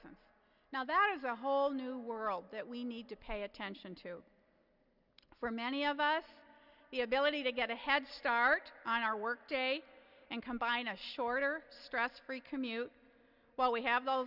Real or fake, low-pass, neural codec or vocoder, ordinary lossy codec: real; 5.4 kHz; none; Opus, 64 kbps